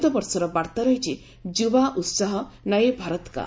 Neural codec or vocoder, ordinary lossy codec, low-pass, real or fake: none; none; none; real